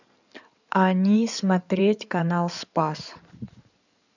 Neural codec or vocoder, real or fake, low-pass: codec, 16 kHz in and 24 kHz out, 2.2 kbps, FireRedTTS-2 codec; fake; 7.2 kHz